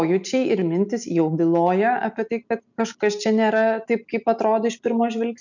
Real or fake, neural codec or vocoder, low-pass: real; none; 7.2 kHz